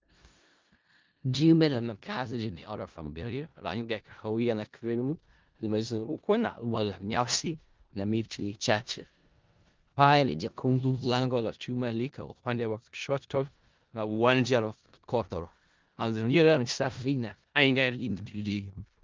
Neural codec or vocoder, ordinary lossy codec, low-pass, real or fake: codec, 16 kHz in and 24 kHz out, 0.4 kbps, LongCat-Audio-Codec, four codebook decoder; Opus, 32 kbps; 7.2 kHz; fake